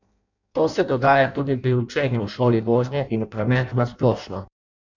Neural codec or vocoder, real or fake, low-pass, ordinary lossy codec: codec, 16 kHz in and 24 kHz out, 0.6 kbps, FireRedTTS-2 codec; fake; 7.2 kHz; none